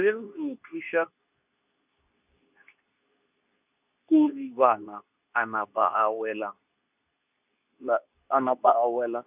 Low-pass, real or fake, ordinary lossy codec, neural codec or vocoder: 3.6 kHz; fake; none; codec, 24 kHz, 0.9 kbps, WavTokenizer, medium speech release version 2